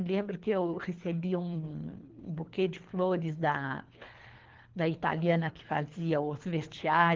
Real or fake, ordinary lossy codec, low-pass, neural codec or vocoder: fake; Opus, 16 kbps; 7.2 kHz; codec, 24 kHz, 3 kbps, HILCodec